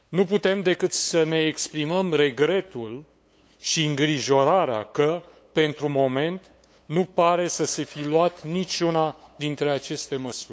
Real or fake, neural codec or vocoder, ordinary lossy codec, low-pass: fake; codec, 16 kHz, 8 kbps, FunCodec, trained on LibriTTS, 25 frames a second; none; none